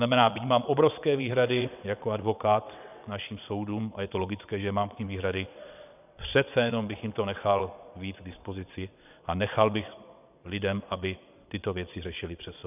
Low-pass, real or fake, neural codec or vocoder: 3.6 kHz; fake; vocoder, 22.05 kHz, 80 mel bands, WaveNeXt